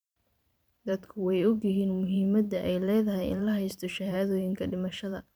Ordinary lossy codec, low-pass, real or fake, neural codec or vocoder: none; none; fake; vocoder, 44.1 kHz, 128 mel bands every 512 samples, BigVGAN v2